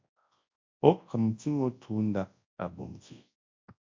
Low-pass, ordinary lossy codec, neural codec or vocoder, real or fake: 7.2 kHz; MP3, 48 kbps; codec, 24 kHz, 0.9 kbps, WavTokenizer, large speech release; fake